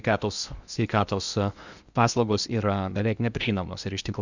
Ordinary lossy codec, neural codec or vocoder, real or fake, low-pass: Opus, 64 kbps; codec, 16 kHz in and 24 kHz out, 0.8 kbps, FocalCodec, streaming, 65536 codes; fake; 7.2 kHz